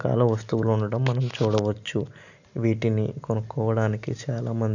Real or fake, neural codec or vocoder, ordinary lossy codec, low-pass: real; none; none; 7.2 kHz